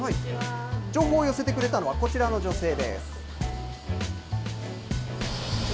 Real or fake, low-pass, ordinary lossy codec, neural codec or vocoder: real; none; none; none